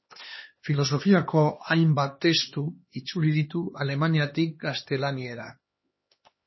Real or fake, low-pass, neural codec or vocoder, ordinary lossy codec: fake; 7.2 kHz; codec, 16 kHz, 2 kbps, X-Codec, HuBERT features, trained on LibriSpeech; MP3, 24 kbps